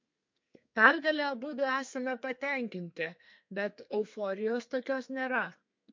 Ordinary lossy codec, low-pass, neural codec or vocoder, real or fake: MP3, 48 kbps; 7.2 kHz; codec, 32 kHz, 1.9 kbps, SNAC; fake